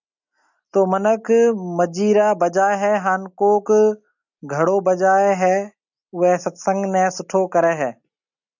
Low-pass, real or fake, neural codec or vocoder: 7.2 kHz; real; none